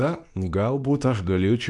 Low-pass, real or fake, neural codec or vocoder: 10.8 kHz; fake; codec, 24 kHz, 0.9 kbps, WavTokenizer, medium speech release version 2